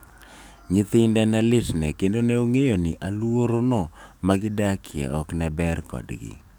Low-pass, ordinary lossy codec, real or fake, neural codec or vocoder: none; none; fake; codec, 44.1 kHz, 7.8 kbps, DAC